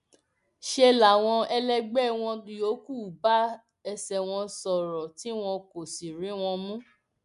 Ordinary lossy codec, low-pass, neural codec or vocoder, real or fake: MP3, 96 kbps; 10.8 kHz; none; real